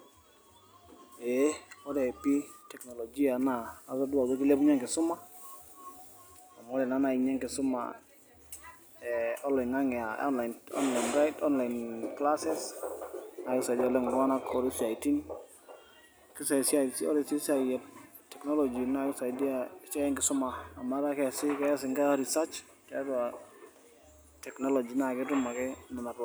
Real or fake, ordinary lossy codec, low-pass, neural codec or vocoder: real; none; none; none